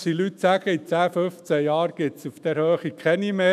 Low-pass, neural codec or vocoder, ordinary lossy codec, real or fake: 14.4 kHz; autoencoder, 48 kHz, 128 numbers a frame, DAC-VAE, trained on Japanese speech; none; fake